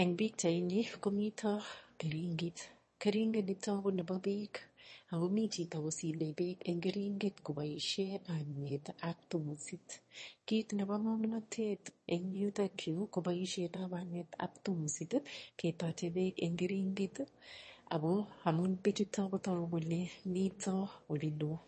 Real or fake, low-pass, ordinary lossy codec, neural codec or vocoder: fake; 9.9 kHz; MP3, 32 kbps; autoencoder, 22.05 kHz, a latent of 192 numbers a frame, VITS, trained on one speaker